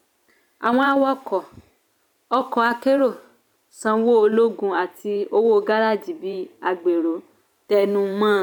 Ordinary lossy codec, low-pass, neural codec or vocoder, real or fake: none; 19.8 kHz; vocoder, 44.1 kHz, 128 mel bands every 512 samples, BigVGAN v2; fake